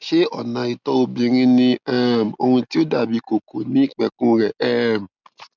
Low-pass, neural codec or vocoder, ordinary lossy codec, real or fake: 7.2 kHz; none; none; real